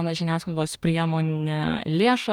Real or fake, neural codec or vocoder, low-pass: fake; codec, 44.1 kHz, 2.6 kbps, DAC; 19.8 kHz